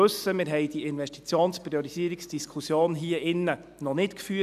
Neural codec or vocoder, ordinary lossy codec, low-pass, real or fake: none; none; 14.4 kHz; real